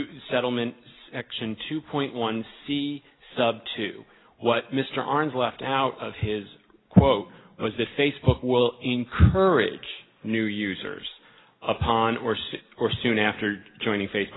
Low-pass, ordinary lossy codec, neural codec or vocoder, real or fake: 7.2 kHz; AAC, 16 kbps; none; real